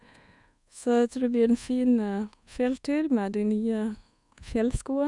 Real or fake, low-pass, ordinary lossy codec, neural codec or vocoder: fake; 10.8 kHz; none; codec, 24 kHz, 1.2 kbps, DualCodec